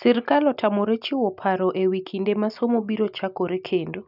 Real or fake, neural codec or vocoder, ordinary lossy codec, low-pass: real; none; none; 5.4 kHz